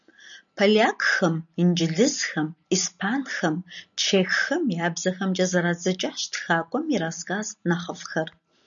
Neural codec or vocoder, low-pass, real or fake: none; 7.2 kHz; real